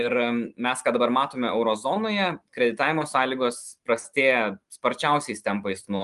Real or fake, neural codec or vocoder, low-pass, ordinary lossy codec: real; none; 10.8 kHz; Opus, 32 kbps